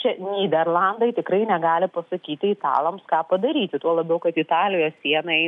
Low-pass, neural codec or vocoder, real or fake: 10.8 kHz; none; real